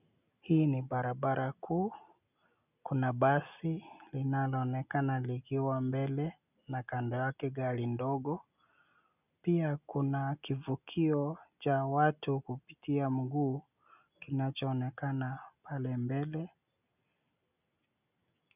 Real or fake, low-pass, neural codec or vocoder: real; 3.6 kHz; none